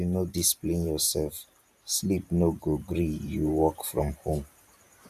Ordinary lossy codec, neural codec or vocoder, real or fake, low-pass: none; vocoder, 44.1 kHz, 128 mel bands every 512 samples, BigVGAN v2; fake; 14.4 kHz